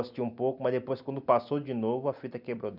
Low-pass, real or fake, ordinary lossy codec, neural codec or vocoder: 5.4 kHz; real; none; none